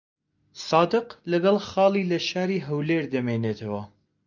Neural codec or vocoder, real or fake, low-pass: none; real; 7.2 kHz